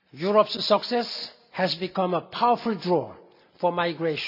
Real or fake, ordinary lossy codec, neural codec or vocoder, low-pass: real; none; none; 5.4 kHz